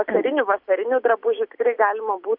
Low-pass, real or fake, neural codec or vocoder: 5.4 kHz; real; none